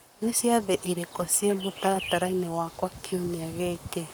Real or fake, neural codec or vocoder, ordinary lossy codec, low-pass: fake; codec, 44.1 kHz, 7.8 kbps, Pupu-Codec; none; none